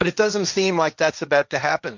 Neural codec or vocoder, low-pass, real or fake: codec, 16 kHz, 1.1 kbps, Voila-Tokenizer; 7.2 kHz; fake